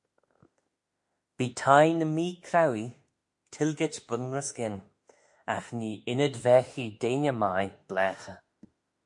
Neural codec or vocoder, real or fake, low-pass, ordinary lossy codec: autoencoder, 48 kHz, 32 numbers a frame, DAC-VAE, trained on Japanese speech; fake; 10.8 kHz; MP3, 48 kbps